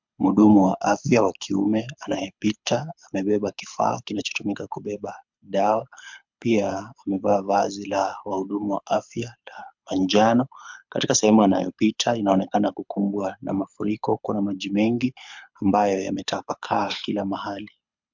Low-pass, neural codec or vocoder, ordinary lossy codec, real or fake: 7.2 kHz; codec, 24 kHz, 6 kbps, HILCodec; MP3, 64 kbps; fake